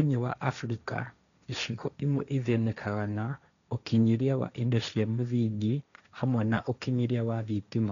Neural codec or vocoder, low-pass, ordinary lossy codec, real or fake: codec, 16 kHz, 1.1 kbps, Voila-Tokenizer; 7.2 kHz; none; fake